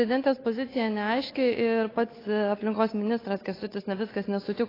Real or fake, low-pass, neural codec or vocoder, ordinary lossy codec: fake; 5.4 kHz; codec, 16 kHz, 8 kbps, FunCodec, trained on Chinese and English, 25 frames a second; AAC, 24 kbps